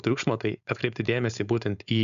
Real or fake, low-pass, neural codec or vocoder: fake; 7.2 kHz; codec, 16 kHz, 4.8 kbps, FACodec